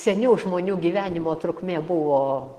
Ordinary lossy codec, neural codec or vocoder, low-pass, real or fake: Opus, 16 kbps; none; 14.4 kHz; real